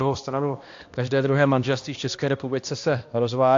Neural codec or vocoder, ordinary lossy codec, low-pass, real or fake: codec, 16 kHz, 1 kbps, X-Codec, HuBERT features, trained on balanced general audio; AAC, 48 kbps; 7.2 kHz; fake